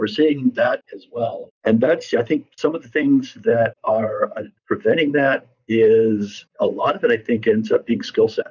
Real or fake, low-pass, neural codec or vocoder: fake; 7.2 kHz; vocoder, 44.1 kHz, 128 mel bands, Pupu-Vocoder